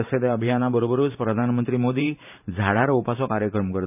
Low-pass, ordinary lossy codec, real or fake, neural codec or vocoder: 3.6 kHz; none; fake; vocoder, 44.1 kHz, 128 mel bands every 512 samples, BigVGAN v2